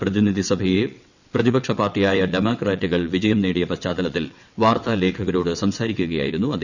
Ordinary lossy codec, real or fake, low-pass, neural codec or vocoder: none; fake; 7.2 kHz; vocoder, 22.05 kHz, 80 mel bands, WaveNeXt